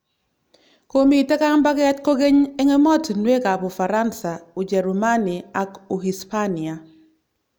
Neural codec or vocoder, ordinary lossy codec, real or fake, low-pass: none; none; real; none